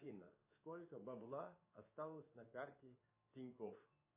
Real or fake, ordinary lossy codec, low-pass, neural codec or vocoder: fake; MP3, 24 kbps; 3.6 kHz; codec, 16 kHz in and 24 kHz out, 1 kbps, XY-Tokenizer